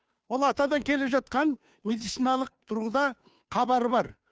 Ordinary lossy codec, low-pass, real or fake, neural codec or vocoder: none; none; fake; codec, 16 kHz, 2 kbps, FunCodec, trained on Chinese and English, 25 frames a second